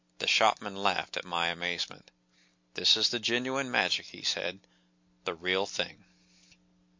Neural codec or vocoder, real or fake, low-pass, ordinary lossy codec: none; real; 7.2 kHz; MP3, 48 kbps